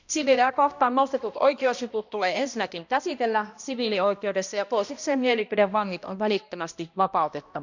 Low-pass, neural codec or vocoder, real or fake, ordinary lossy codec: 7.2 kHz; codec, 16 kHz, 1 kbps, X-Codec, HuBERT features, trained on balanced general audio; fake; none